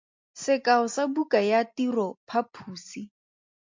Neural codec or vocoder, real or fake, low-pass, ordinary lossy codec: none; real; 7.2 kHz; MP3, 48 kbps